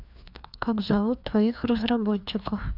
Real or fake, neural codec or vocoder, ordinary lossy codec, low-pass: fake; codec, 16 kHz, 2 kbps, FreqCodec, larger model; none; 5.4 kHz